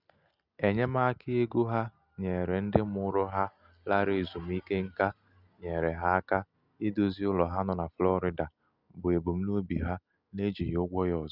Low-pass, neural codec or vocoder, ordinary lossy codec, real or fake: 5.4 kHz; none; Opus, 64 kbps; real